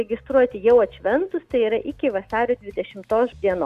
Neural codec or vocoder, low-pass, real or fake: none; 14.4 kHz; real